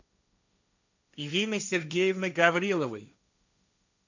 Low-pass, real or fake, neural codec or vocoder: 7.2 kHz; fake; codec, 16 kHz, 1.1 kbps, Voila-Tokenizer